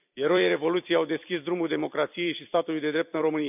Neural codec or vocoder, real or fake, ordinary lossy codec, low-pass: vocoder, 44.1 kHz, 128 mel bands every 256 samples, BigVGAN v2; fake; none; 3.6 kHz